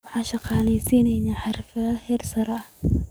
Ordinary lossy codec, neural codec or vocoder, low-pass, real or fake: none; codec, 44.1 kHz, 7.8 kbps, DAC; none; fake